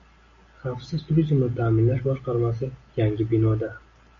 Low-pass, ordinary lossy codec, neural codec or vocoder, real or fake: 7.2 kHz; MP3, 48 kbps; none; real